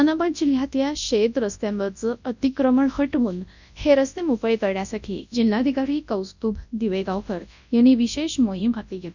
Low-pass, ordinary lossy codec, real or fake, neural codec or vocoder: 7.2 kHz; none; fake; codec, 24 kHz, 0.9 kbps, WavTokenizer, large speech release